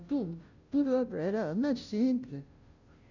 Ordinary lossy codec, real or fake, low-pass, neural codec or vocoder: none; fake; 7.2 kHz; codec, 16 kHz, 0.5 kbps, FunCodec, trained on Chinese and English, 25 frames a second